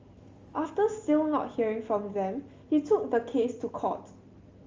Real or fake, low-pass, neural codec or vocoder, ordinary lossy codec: real; 7.2 kHz; none; Opus, 32 kbps